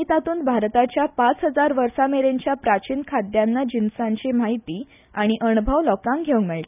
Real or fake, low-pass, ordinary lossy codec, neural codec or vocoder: real; 3.6 kHz; none; none